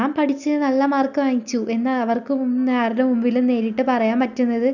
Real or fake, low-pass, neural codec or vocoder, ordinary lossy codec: real; 7.2 kHz; none; none